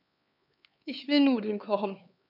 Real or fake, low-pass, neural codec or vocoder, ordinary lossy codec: fake; 5.4 kHz; codec, 16 kHz, 4 kbps, X-Codec, HuBERT features, trained on LibriSpeech; none